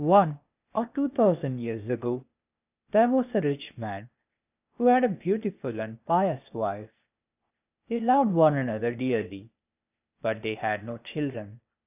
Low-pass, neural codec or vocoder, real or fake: 3.6 kHz; codec, 16 kHz, 0.8 kbps, ZipCodec; fake